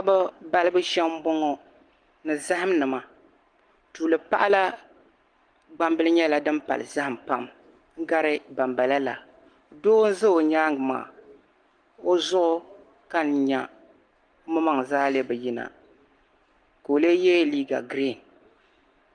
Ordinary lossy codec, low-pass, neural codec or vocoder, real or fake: Opus, 24 kbps; 9.9 kHz; none; real